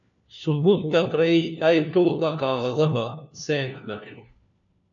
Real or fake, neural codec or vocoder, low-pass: fake; codec, 16 kHz, 1 kbps, FunCodec, trained on LibriTTS, 50 frames a second; 7.2 kHz